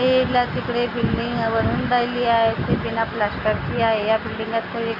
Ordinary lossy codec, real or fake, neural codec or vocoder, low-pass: none; real; none; 5.4 kHz